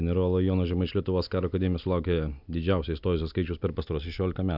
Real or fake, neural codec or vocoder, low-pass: real; none; 5.4 kHz